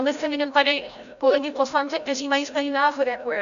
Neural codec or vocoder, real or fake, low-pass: codec, 16 kHz, 0.5 kbps, FreqCodec, larger model; fake; 7.2 kHz